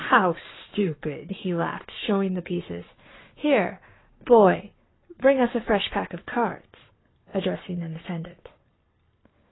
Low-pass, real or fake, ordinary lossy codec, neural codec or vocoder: 7.2 kHz; fake; AAC, 16 kbps; codec, 44.1 kHz, 7.8 kbps, Pupu-Codec